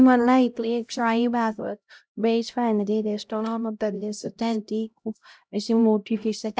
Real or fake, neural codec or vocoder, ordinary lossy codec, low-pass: fake; codec, 16 kHz, 0.5 kbps, X-Codec, HuBERT features, trained on LibriSpeech; none; none